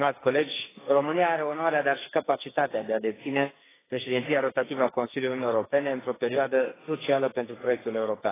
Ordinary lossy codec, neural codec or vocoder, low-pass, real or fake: AAC, 16 kbps; codec, 44.1 kHz, 2.6 kbps, SNAC; 3.6 kHz; fake